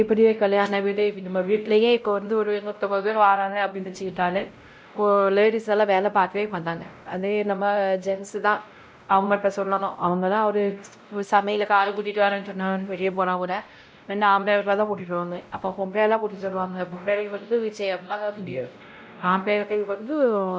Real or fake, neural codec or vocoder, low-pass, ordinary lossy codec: fake; codec, 16 kHz, 0.5 kbps, X-Codec, WavLM features, trained on Multilingual LibriSpeech; none; none